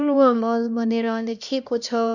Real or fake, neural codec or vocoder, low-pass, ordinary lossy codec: fake; codec, 16 kHz, 1 kbps, X-Codec, HuBERT features, trained on LibriSpeech; 7.2 kHz; none